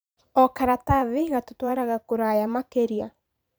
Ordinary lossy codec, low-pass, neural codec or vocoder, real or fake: none; none; vocoder, 44.1 kHz, 128 mel bands every 512 samples, BigVGAN v2; fake